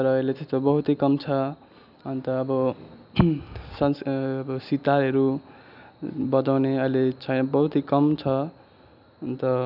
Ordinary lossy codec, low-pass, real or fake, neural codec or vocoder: none; 5.4 kHz; real; none